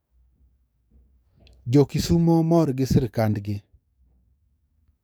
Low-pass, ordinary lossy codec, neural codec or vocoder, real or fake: none; none; codec, 44.1 kHz, 7.8 kbps, DAC; fake